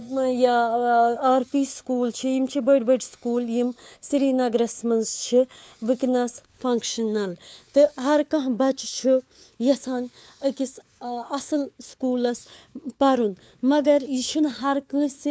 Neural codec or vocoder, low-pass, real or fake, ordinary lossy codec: codec, 16 kHz, 4 kbps, FunCodec, trained on LibriTTS, 50 frames a second; none; fake; none